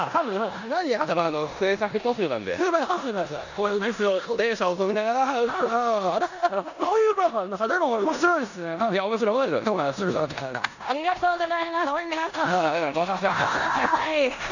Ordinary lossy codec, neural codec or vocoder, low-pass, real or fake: none; codec, 16 kHz in and 24 kHz out, 0.9 kbps, LongCat-Audio-Codec, four codebook decoder; 7.2 kHz; fake